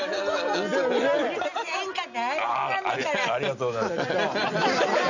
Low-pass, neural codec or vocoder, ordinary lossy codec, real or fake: 7.2 kHz; none; none; real